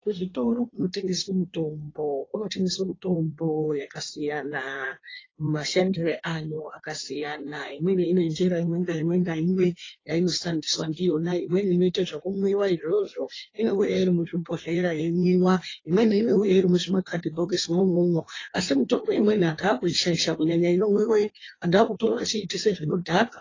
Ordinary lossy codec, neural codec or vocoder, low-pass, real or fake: AAC, 32 kbps; codec, 16 kHz in and 24 kHz out, 1.1 kbps, FireRedTTS-2 codec; 7.2 kHz; fake